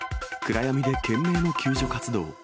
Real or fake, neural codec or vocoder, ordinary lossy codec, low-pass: real; none; none; none